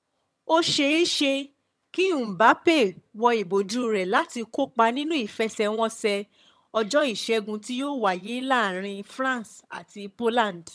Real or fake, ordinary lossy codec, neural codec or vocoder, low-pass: fake; none; vocoder, 22.05 kHz, 80 mel bands, HiFi-GAN; none